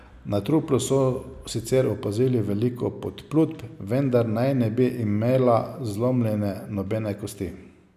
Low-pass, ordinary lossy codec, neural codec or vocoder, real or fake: 14.4 kHz; none; none; real